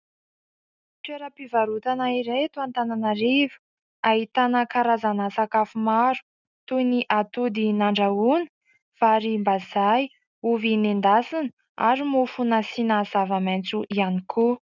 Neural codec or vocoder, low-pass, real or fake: none; 7.2 kHz; real